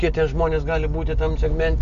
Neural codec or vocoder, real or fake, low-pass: none; real; 7.2 kHz